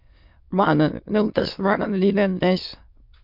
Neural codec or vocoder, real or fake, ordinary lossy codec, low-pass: autoencoder, 22.05 kHz, a latent of 192 numbers a frame, VITS, trained on many speakers; fake; MP3, 48 kbps; 5.4 kHz